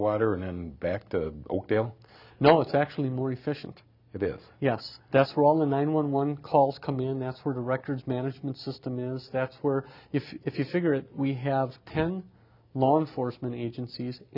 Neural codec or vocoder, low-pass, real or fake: none; 5.4 kHz; real